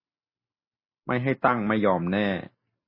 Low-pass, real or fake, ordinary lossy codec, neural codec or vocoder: 5.4 kHz; real; MP3, 24 kbps; none